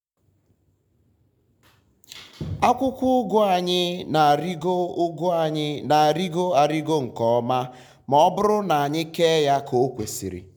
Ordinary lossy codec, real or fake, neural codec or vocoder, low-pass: none; real; none; 19.8 kHz